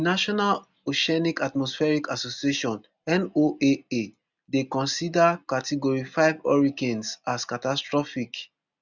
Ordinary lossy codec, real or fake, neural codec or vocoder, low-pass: none; real; none; 7.2 kHz